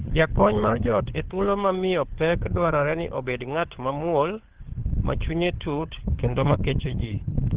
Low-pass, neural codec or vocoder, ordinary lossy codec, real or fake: 3.6 kHz; codec, 16 kHz, 4 kbps, FunCodec, trained on LibriTTS, 50 frames a second; Opus, 16 kbps; fake